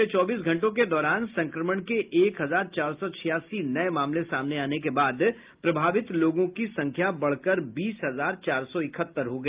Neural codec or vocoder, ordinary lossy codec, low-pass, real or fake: none; Opus, 32 kbps; 3.6 kHz; real